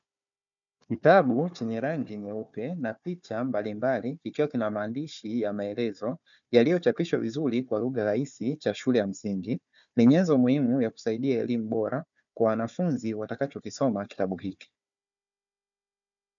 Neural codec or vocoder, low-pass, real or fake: codec, 16 kHz, 4 kbps, FunCodec, trained on Chinese and English, 50 frames a second; 7.2 kHz; fake